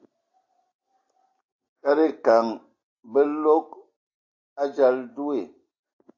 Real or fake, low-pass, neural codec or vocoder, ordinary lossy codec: real; 7.2 kHz; none; AAC, 48 kbps